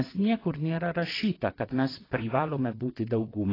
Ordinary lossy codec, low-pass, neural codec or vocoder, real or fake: AAC, 24 kbps; 5.4 kHz; vocoder, 22.05 kHz, 80 mel bands, WaveNeXt; fake